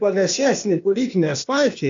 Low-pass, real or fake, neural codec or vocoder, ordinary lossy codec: 7.2 kHz; fake; codec, 16 kHz, 0.8 kbps, ZipCodec; AAC, 64 kbps